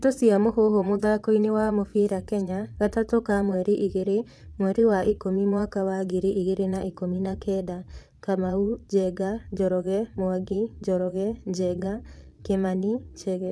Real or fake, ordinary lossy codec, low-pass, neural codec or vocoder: fake; none; none; vocoder, 22.05 kHz, 80 mel bands, Vocos